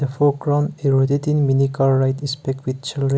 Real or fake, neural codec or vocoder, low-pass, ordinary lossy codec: real; none; none; none